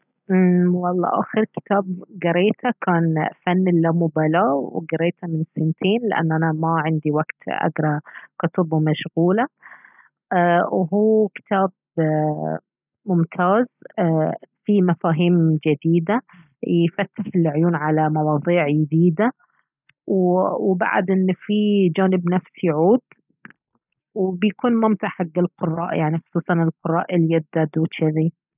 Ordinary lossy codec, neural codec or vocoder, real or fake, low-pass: none; none; real; 3.6 kHz